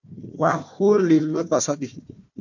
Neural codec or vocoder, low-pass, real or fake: codec, 16 kHz, 1 kbps, FunCodec, trained on Chinese and English, 50 frames a second; 7.2 kHz; fake